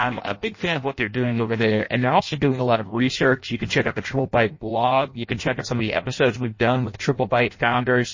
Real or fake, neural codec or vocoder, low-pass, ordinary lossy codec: fake; codec, 16 kHz in and 24 kHz out, 0.6 kbps, FireRedTTS-2 codec; 7.2 kHz; MP3, 32 kbps